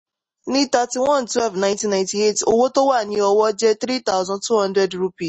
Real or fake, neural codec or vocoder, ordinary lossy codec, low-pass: real; none; MP3, 32 kbps; 9.9 kHz